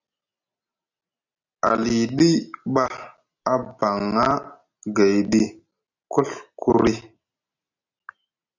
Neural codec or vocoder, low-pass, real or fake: none; 7.2 kHz; real